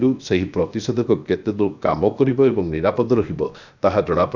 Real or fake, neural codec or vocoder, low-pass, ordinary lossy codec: fake; codec, 16 kHz, 0.7 kbps, FocalCodec; 7.2 kHz; none